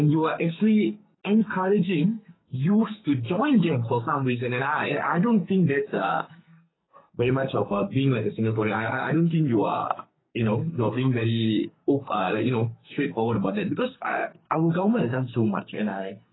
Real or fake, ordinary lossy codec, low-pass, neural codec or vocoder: fake; AAC, 16 kbps; 7.2 kHz; codec, 44.1 kHz, 2.6 kbps, SNAC